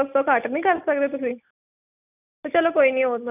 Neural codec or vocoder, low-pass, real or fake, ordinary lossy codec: none; 3.6 kHz; real; none